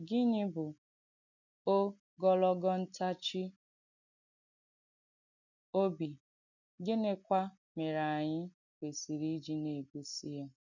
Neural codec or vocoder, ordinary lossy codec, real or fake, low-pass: none; none; real; 7.2 kHz